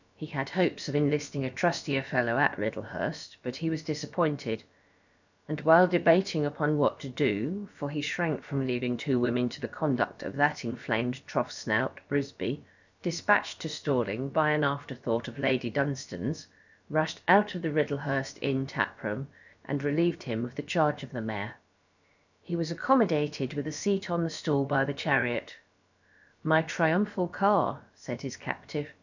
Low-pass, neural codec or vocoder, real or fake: 7.2 kHz; codec, 16 kHz, about 1 kbps, DyCAST, with the encoder's durations; fake